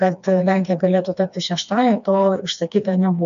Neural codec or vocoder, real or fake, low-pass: codec, 16 kHz, 2 kbps, FreqCodec, smaller model; fake; 7.2 kHz